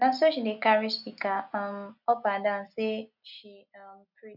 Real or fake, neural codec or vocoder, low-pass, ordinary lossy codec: real; none; 5.4 kHz; none